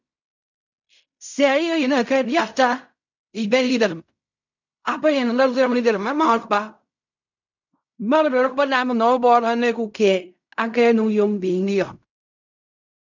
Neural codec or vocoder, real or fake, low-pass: codec, 16 kHz in and 24 kHz out, 0.4 kbps, LongCat-Audio-Codec, fine tuned four codebook decoder; fake; 7.2 kHz